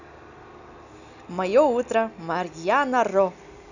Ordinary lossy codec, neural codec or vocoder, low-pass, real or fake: none; none; 7.2 kHz; real